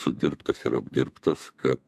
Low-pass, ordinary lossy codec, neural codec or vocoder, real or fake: 14.4 kHz; MP3, 96 kbps; codec, 32 kHz, 1.9 kbps, SNAC; fake